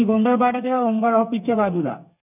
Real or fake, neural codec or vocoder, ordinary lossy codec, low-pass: fake; codec, 32 kHz, 1.9 kbps, SNAC; none; 3.6 kHz